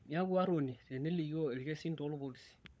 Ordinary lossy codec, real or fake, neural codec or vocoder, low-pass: none; fake; codec, 16 kHz, 16 kbps, FunCodec, trained on LibriTTS, 50 frames a second; none